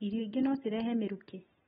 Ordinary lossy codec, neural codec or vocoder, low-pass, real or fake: AAC, 16 kbps; none; 19.8 kHz; real